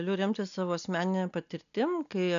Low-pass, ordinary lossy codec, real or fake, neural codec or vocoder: 7.2 kHz; MP3, 96 kbps; real; none